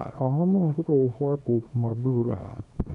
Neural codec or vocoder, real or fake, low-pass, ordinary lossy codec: codec, 24 kHz, 0.9 kbps, WavTokenizer, small release; fake; 10.8 kHz; none